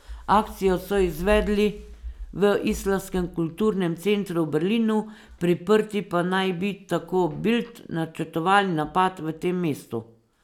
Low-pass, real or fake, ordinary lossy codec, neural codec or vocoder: 19.8 kHz; real; none; none